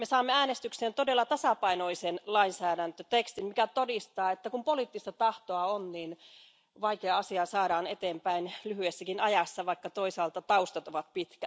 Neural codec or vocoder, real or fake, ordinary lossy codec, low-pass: none; real; none; none